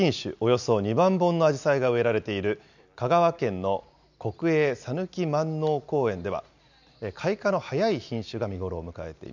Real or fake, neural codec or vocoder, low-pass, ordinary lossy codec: real; none; 7.2 kHz; none